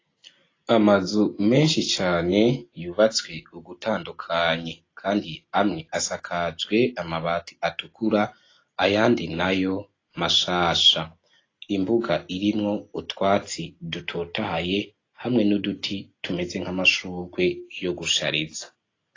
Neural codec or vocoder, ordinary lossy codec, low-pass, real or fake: none; AAC, 32 kbps; 7.2 kHz; real